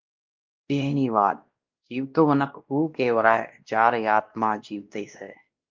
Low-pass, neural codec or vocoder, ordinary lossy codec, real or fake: 7.2 kHz; codec, 16 kHz, 1 kbps, X-Codec, WavLM features, trained on Multilingual LibriSpeech; Opus, 32 kbps; fake